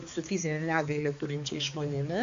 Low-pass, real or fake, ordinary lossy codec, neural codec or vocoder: 7.2 kHz; fake; AAC, 64 kbps; codec, 16 kHz, 4 kbps, X-Codec, HuBERT features, trained on balanced general audio